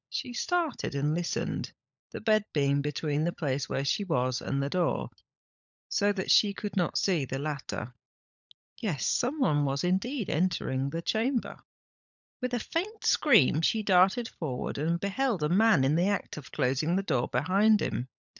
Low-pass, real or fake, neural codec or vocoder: 7.2 kHz; fake; codec, 16 kHz, 16 kbps, FunCodec, trained on LibriTTS, 50 frames a second